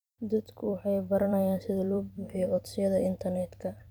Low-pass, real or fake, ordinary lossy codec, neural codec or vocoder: none; fake; none; vocoder, 44.1 kHz, 128 mel bands every 256 samples, BigVGAN v2